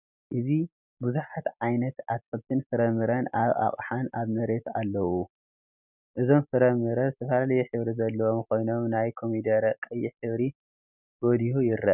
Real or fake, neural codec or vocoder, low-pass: real; none; 3.6 kHz